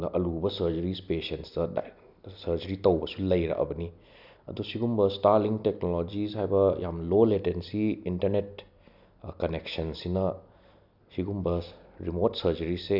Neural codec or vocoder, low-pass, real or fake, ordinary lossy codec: none; 5.4 kHz; real; none